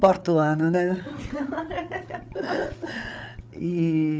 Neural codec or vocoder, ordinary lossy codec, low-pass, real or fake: codec, 16 kHz, 8 kbps, FreqCodec, larger model; none; none; fake